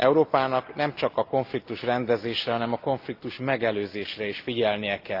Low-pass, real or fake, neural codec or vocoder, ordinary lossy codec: 5.4 kHz; real; none; Opus, 32 kbps